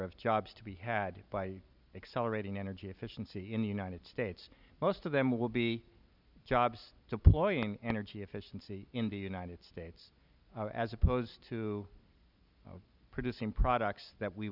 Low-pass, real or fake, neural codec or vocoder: 5.4 kHz; real; none